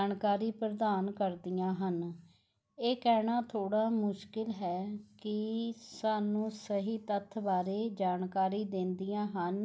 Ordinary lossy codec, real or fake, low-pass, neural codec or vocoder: none; real; none; none